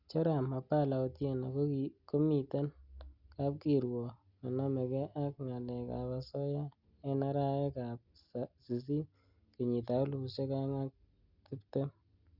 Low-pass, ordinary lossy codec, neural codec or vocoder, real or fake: 5.4 kHz; Opus, 64 kbps; none; real